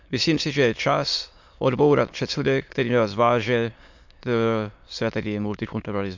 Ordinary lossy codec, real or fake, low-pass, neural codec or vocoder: MP3, 64 kbps; fake; 7.2 kHz; autoencoder, 22.05 kHz, a latent of 192 numbers a frame, VITS, trained on many speakers